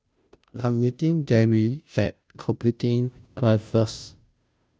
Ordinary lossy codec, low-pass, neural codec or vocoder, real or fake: none; none; codec, 16 kHz, 0.5 kbps, FunCodec, trained on Chinese and English, 25 frames a second; fake